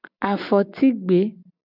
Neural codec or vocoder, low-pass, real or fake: none; 5.4 kHz; real